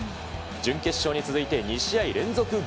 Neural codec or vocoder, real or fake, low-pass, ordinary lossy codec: none; real; none; none